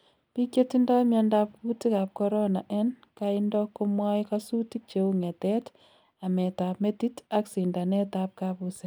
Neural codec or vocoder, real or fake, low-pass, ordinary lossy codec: none; real; none; none